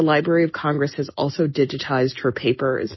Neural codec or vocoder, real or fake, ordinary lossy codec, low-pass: none; real; MP3, 24 kbps; 7.2 kHz